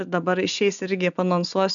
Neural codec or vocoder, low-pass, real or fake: none; 7.2 kHz; real